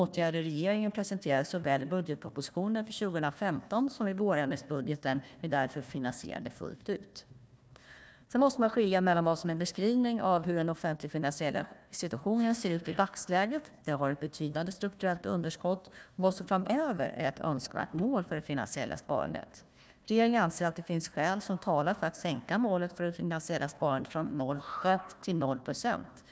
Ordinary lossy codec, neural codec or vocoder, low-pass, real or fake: none; codec, 16 kHz, 1 kbps, FunCodec, trained on Chinese and English, 50 frames a second; none; fake